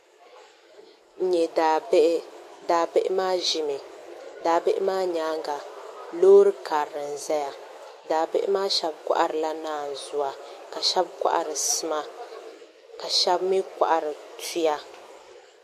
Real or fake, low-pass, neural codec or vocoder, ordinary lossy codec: fake; 14.4 kHz; autoencoder, 48 kHz, 128 numbers a frame, DAC-VAE, trained on Japanese speech; MP3, 64 kbps